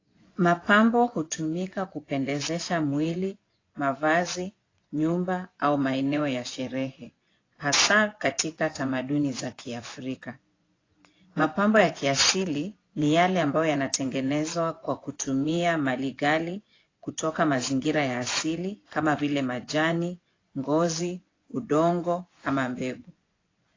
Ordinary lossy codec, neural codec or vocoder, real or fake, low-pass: AAC, 32 kbps; vocoder, 24 kHz, 100 mel bands, Vocos; fake; 7.2 kHz